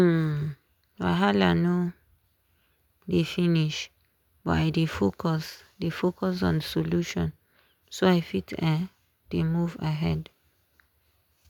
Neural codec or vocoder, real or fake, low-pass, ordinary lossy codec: none; real; 19.8 kHz; none